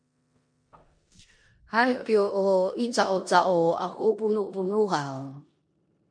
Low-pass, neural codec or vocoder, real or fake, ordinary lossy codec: 9.9 kHz; codec, 16 kHz in and 24 kHz out, 0.9 kbps, LongCat-Audio-Codec, four codebook decoder; fake; MP3, 48 kbps